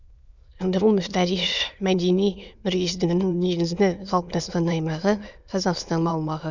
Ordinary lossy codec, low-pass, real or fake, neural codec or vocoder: none; 7.2 kHz; fake; autoencoder, 22.05 kHz, a latent of 192 numbers a frame, VITS, trained on many speakers